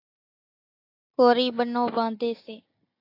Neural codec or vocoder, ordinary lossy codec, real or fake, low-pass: none; AAC, 32 kbps; real; 5.4 kHz